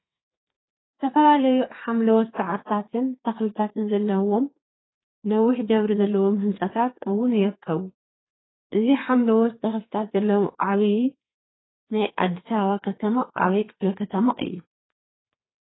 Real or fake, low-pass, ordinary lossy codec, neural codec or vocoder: fake; 7.2 kHz; AAC, 16 kbps; codec, 32 kHz, 1.9 kbps, SNAC